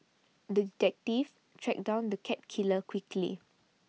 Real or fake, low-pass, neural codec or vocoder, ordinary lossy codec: real; none; none; none